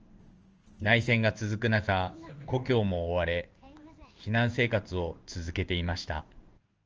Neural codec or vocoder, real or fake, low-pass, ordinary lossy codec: codec, 16 kHz in and 24 kHz out, 1 kbps, XY-Tokenizer; fake; 7.2 kHz; Opus, 24 kbps